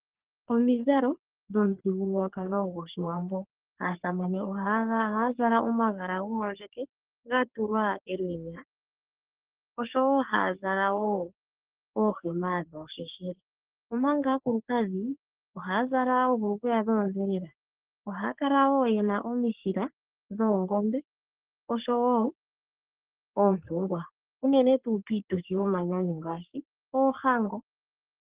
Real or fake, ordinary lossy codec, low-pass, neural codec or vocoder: fake; Opus, 16 kbps; 3.6 kHz; codec, 44.1 kHz, 3.4 kbps, Pupu-Codec